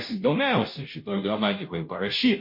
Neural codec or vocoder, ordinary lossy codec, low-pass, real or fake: codec, 16 kHz, 0.5 kbps, FunCodec, trained on Chinese and English, 25 frames a second; MP3, 32 kbps; 5.4 kHz; fake